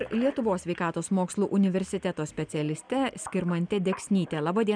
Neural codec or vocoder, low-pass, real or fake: none; 9.9 kHz; real